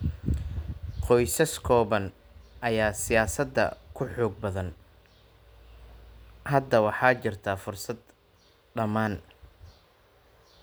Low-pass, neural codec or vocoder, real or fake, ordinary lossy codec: none; none; real; none